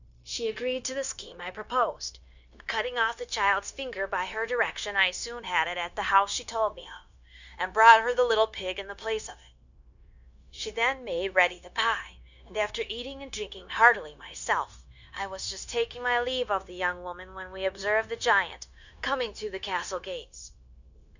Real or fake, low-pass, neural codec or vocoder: fake; 7.2 kHz; codec, 16 kHz, 0.9 kbps, LongCat-Audio-Codec